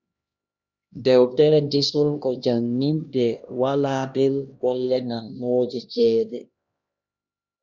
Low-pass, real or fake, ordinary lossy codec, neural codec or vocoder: 7.2 kHz; fake; Opus, 64 kbps; codec, 16 kHz, 1 kbps, X-Codec, HuBERT features, trained on LibriSpeech